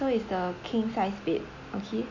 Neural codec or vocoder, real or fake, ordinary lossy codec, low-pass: none; real; none; 7.2 kHz